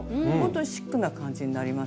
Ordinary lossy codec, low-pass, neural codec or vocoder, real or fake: none; none; none; real